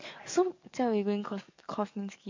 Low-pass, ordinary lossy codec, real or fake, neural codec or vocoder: 7.2 kHz; MP3, 48 kbps; fake; codec, 44.1 kHz, 7.8 kbps, DAC